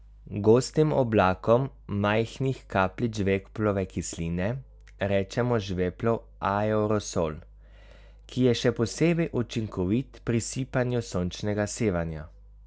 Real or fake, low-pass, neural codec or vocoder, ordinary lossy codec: real; none; none; none